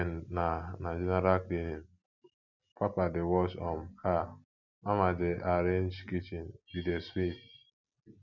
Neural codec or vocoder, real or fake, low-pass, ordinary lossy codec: none; real; 7.2 kHz; none